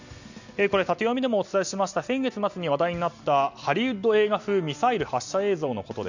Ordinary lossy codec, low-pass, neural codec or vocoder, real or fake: none; 7.2 kHz; none; real